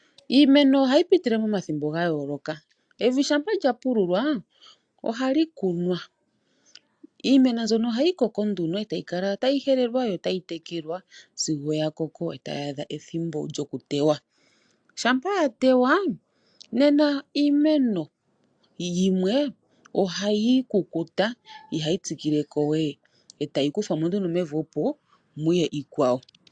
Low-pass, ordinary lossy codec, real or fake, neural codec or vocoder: 9.9 kHz; AAC, 64 kbps; real; none